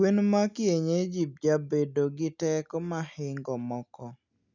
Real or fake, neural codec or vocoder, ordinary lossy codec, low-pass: real; none; none; 7.2 kHz